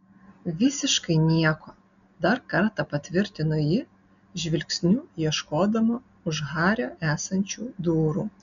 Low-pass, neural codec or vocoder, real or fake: 7.2 kHz; none; real